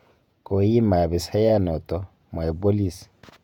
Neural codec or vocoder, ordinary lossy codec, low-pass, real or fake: vocoder, 48 kHz, 128 mel bands, Vocos; none; 19.8 kHz; fake